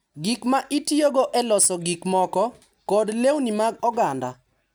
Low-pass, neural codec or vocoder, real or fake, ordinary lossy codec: none; none; real; none